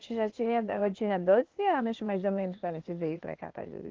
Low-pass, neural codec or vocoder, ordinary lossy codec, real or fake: 7.2 kHz; codec, 16 kHz, 0.8 kbps, ZipCodec; Opus, 16 kbps; fake